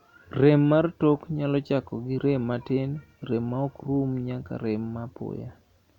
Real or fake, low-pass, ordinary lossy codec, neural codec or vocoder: real; 19.8 kHz; none; none